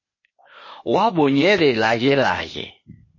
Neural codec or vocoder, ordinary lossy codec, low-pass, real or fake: codec, 16 kHz, 0.8 kbps, ZipCodec; MP3, 32 kbps; 7.2 kHz; fake